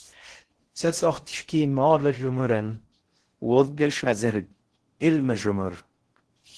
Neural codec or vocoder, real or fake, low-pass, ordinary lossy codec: codec, 16 kHz in and 24 kHz out, 0.6 kbps, FocalCodec, streaming, 4096 codes; fake; 10.8 kHz; Opus, 16 kbps